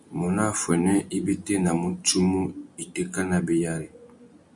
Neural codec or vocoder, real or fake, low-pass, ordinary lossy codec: none; real; 10.8 kHz; MP3, 96 kbps